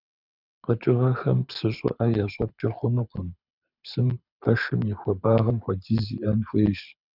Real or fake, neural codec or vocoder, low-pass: fake; codec, 24 kHz, 6 kbps, HILCodec; 5.4 kHz